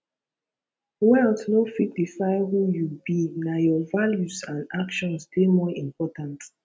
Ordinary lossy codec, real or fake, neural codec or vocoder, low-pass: none; real; none; none